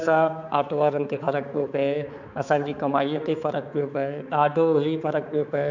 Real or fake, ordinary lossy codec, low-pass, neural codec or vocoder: fake; none; 7.2 kHz; codec, 16 kHz, 4 kbps, X-Codec, HuBERT features, trained on balanced general audio